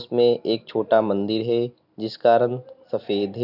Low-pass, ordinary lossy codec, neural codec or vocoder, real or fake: 5.4 kHz; none; none; real